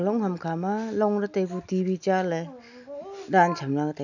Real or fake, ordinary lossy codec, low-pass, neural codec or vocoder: real; none; 7.2 kHz; none